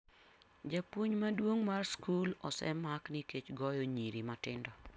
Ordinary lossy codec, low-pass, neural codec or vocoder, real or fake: none; none; none; real